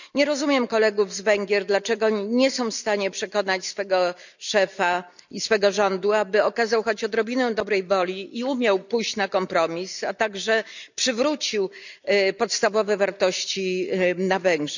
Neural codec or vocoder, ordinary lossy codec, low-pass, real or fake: none; none; 7.2 kHz; real